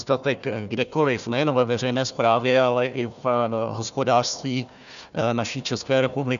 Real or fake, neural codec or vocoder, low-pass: fake; codec, 16 kHz, 1 kbps, FunCodec, trained on Chinese and English, 50 frames a second; 7.2 kHz